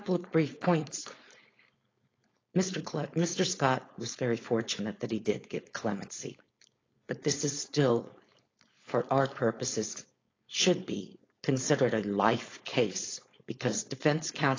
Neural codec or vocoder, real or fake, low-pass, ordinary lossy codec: codec, 16 kHz, 4.8 kbps, FACodec; fake; 7.2 kHz; AAC, 32 kbps